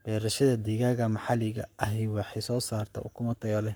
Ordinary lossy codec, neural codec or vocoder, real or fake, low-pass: none; codec, 44.1 kHz, 7.8 kbps, Pupu-Codec; fake; none